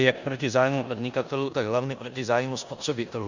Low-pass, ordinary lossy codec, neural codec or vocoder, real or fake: 7.2 kHz; Opus, 64 kbps; codec, 16 kHz in and 24 kHz out, 0.9 kbps, LongCat-Audio-Codec, four codebook decoder; fake